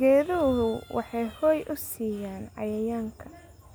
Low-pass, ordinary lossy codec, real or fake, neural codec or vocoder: none; none; real; none